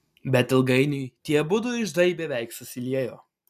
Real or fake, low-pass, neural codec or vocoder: real; 14.4 kHz; none